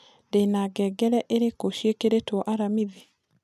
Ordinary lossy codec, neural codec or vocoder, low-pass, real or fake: none; none; 14.4 kHz; real